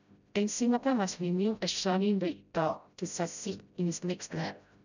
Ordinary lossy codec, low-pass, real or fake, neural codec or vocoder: none; 7.2 kHz; fake; codec, 16 kHz, 0.5 kbps, FreqCodec, smaller model